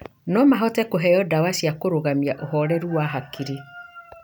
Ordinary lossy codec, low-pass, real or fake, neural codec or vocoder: none; none; real; none